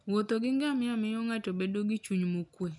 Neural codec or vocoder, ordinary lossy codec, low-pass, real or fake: none; none; 10.8 kHz; real